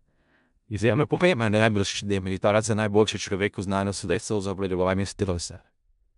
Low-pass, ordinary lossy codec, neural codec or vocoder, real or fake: 10.8 kHz; none; codec, 16 kHz in and 24 kHz out, 0.4 kbps, LongCat-Audio-Codec, four codebook decoder; fake